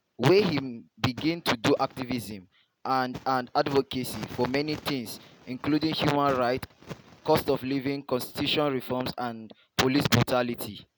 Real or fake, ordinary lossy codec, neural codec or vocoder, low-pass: real; none; none; none